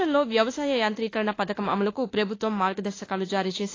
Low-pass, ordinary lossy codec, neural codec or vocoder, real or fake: 7.2 kHz; AAC, 32 kbps; autoencoder, 48 kHz, 32 numbers a frame, DAC-VAE, trained on Japanese speech; fake